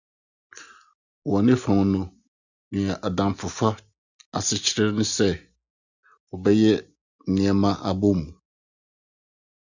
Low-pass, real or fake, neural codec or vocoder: 7.2 kHz; real; none